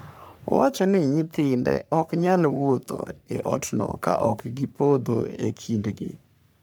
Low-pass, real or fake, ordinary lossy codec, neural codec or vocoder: none; fake; none; codec, 44.1 kHz, 3.4 kbps, Pupu-Codec